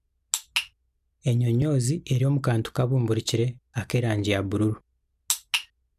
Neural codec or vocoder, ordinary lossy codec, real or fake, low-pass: none; none; real; 14.4 kHz